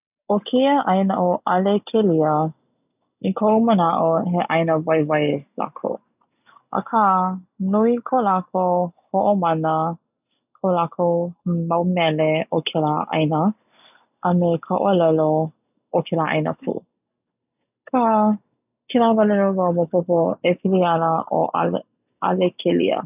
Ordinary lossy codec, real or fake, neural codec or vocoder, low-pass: none; real; none; 3.6 kHz